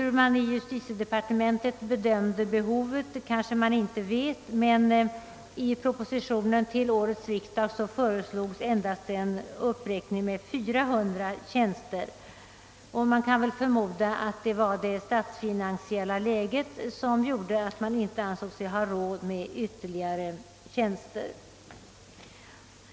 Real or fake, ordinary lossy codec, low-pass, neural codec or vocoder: real; none; none; none